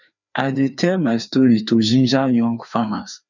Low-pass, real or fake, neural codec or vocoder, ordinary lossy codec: 7.2 kHz; fake; codec, 16 kHz, 2 kbps, FreqCodec, larger model; none